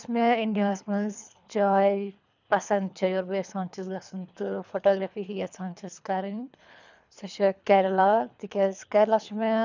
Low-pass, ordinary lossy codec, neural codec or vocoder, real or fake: 7.2 kHz; none; codec, 24 kHz, 3 kbps, HILCodec; fake